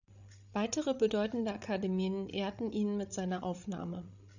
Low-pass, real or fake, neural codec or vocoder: 7.2 kHz; fake; codec, 16 kHz, 16 kbps, FreqCodec, larger model